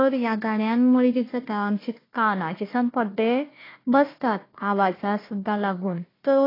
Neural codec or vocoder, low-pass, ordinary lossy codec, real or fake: codec, 16 kHz, 1 kbps, FunCodec, trained on Chinese and English, 50 frames a second; 5.4 kHz; AAC, 24 kbps; fake